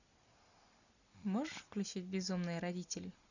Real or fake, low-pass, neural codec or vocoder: real; 7.2 kHz; none